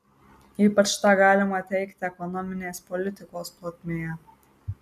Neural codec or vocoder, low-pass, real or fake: none; 14.4 kHz; real